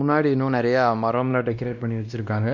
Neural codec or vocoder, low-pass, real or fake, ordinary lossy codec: codec, 16 kHz, 1 kbps, X-Codec, WavLM features, trained on Multilingual LibriSpeech; 7.2 kHz; fake; none